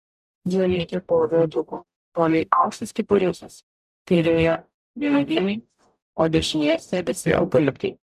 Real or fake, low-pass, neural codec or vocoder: fake; 14.4 kHz; codec, 44.1 kHz, 0.9 kbps, DAC